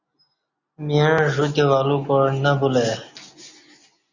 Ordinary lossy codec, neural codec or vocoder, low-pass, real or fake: Opus, 64 kbps; none; 7.2 kHz; real